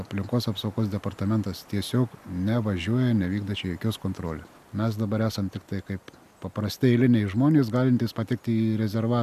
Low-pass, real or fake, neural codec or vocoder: 14.4 kHz; real; none